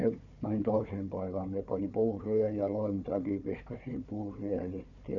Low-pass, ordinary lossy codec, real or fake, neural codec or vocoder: 7.2 kHz; none; fake; codec, 16 kHz, 4 kbps, FunCodec, trained on Chinese and English, 50 frames a second